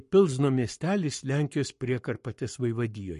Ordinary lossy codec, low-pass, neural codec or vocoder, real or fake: MP3, 48 kbps; 14.4 kHz; codec, 44.1 kHz, 7.8 kbps, Pupu-Codec; fake